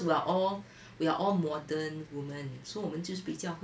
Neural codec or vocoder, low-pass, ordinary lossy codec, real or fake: none; none; none; real